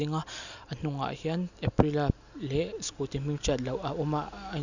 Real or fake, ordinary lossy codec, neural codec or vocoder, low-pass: real; none; none; 7.2 kHz